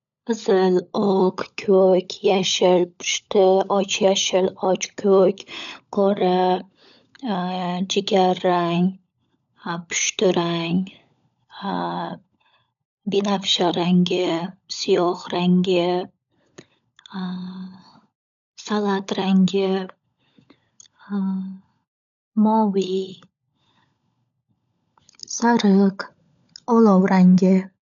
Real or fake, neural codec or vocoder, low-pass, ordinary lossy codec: fake; codec, 16 kHz, 16 kbps, FunCodec, trained on LibriTTS, 50 frames a second; 7.2 kHz; none